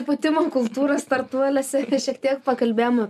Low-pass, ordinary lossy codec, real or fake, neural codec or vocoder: 14.4 kHz; MP3, 96 kbps; real; none